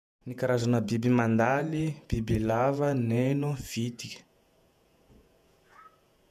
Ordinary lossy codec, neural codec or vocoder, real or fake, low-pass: none; vocoder, 48 kHz, 128 mel bands, Vocos; fake; 14.4 kHz